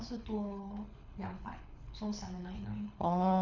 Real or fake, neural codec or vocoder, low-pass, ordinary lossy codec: fake; codec, 24 kHz, 6 kbps, HILCodec; 7.2 kHz; none